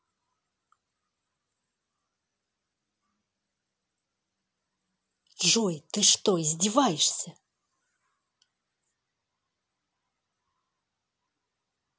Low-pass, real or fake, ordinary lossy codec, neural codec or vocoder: none; real; none; none